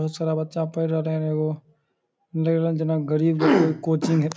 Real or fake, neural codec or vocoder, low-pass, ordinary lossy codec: real; none; none; none